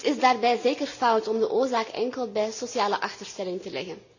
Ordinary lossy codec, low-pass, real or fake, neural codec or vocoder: none; 7.2 kHz; real; none